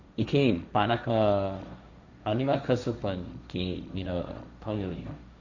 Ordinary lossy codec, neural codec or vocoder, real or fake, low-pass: none; codec, 16 kHz, 1.1 kbps, Voila-Tokenizer; fake; 7.2 kHz